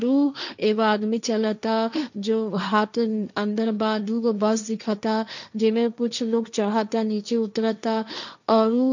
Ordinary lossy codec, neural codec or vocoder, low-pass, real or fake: none; codec, 16 kHz, 1.1 kbps, Voila-Tokenizer; 7.2 kHz; fake